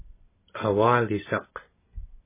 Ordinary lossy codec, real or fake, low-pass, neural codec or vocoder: MP3, 16 kbps; real; 3.6 kHz; none